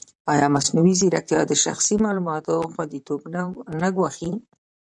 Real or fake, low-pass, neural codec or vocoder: fake; 10.8 kHz; vocoder, 44.1 kHz, 128 mel bands, Pupu-Vocoder